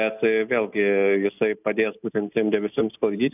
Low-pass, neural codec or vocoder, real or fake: 3.6 kHz; none; real